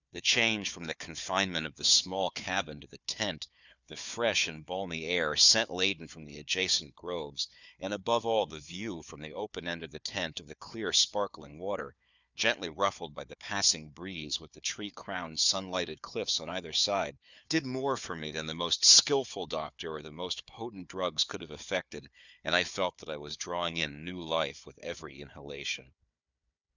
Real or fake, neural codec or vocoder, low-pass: fake; codec, 16 kHz, 4 kbps, FunCodec, trained on Chinese and English, 50 frames a second; 7.2 kHz